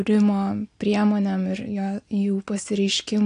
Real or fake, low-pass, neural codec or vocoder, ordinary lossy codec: real; 9.9 kHz; none; AAC, 48 kbps